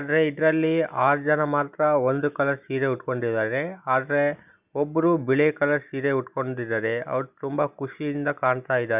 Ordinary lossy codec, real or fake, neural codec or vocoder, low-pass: none; real; none; 3.6 kHz